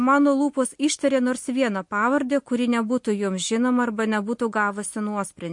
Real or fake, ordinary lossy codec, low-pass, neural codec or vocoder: real; MP3, 48 kbps; 10.8 kHz; none